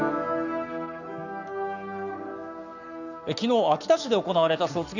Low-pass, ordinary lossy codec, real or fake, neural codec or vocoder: 7.2 kHz; none; fake; codec, 44.1 kHz, 7.8 kbps, Pupu-Codec